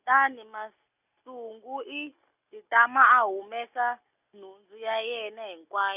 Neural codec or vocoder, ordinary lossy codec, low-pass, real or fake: none; none; 3.6 kHz; real